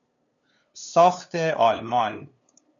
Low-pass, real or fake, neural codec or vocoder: 7.2 kHz; fake; codec, 16 kHz, 2 kbps, FunCodec, trained on LibriTTS, 25 frames a second